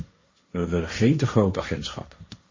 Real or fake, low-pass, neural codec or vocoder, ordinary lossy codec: fake; 7.2 kHz; codec, 16 kHz, 1.1 kbps, Voila-Tokenizer; MP3, 32 kbps